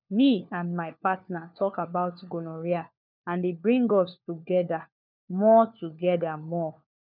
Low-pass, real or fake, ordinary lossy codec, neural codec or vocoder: 5.4 kHz; fake; none; codec, 16 kHz, 4 kbps, FunCodec, trained on LibriTTS, 50 frames a second